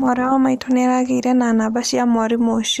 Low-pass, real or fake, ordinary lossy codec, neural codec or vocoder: 14.4 kHz; real; none; none